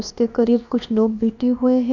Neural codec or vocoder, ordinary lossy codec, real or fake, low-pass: codec, 16 kHz, about 1 kbps, DyCAST, with the encoder's durations; none; fake; 7.2 kHz